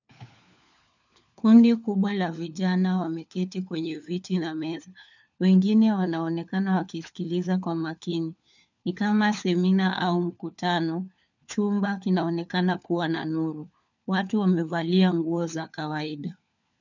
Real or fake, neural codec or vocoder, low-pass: fake; codec, 16 kHz, 4 kbps, FunCodec, trained on LibriTTS, 50 frames a second; 7.2 kHz